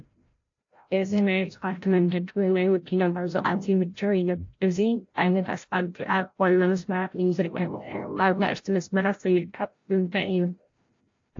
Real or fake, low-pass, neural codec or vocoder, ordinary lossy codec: fake; 7.2 kHz; codec, 16 kHz, 0.5 kbps, FreqCodec, larger model; AAC, 48 kbps